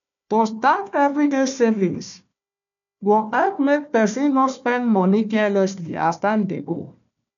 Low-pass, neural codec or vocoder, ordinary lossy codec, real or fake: 7.2 kHz; codec, 16 kHz, 1 kbps, FunCodec, trained on Chinese and English, 50 frames a second; none; fake